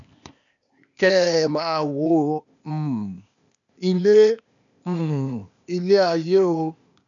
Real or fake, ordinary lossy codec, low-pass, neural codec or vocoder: fake; none; 7.2 kHz; codec, 16 kHz, 0.8 kbps, ZipCodec